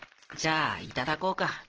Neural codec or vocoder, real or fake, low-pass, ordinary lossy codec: none; real; 7.2 kHz; Opus, 16 kbps